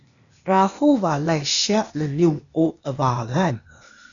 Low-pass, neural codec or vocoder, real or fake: 7.2 kHz; codec, 16 kHz, 0.8 kbps, ZipCodec; fake